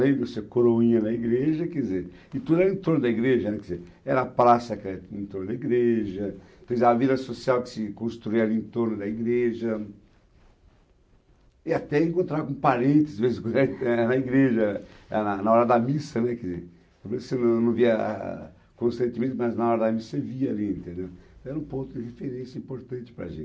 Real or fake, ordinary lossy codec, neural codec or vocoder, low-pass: real; none; none; none